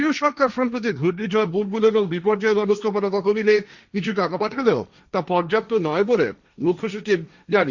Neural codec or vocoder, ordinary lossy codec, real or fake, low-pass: codec, 16 kHz, 1.1 kbps, Voila-Tokenizer; Opus, 64 kbps; fake; 7.2 kHz